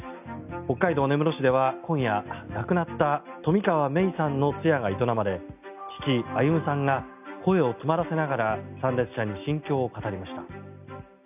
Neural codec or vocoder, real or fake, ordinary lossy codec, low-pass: none; real; none; 3.6 kHz